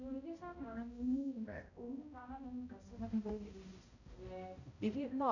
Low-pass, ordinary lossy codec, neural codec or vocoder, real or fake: 7.2 kHz; none; codec, 16 kHz, 0.5 kbps, X-Codec, HuBERT features, trained on balanced general audio; fake